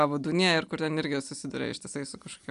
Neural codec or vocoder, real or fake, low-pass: none; real; 10.8 kHz